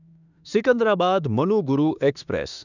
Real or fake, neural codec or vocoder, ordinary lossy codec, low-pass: fake; autoencoder, 48 kHz, 32 numbers a frame, DAC-VAE, trained on Japanese speech; none; 7.2 kHz